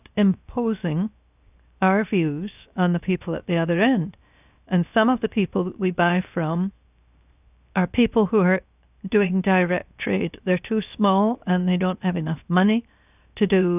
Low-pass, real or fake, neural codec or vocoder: 3.6 kHz; fake; codec, 16 kHz, 0.7 kbps, FocalCodec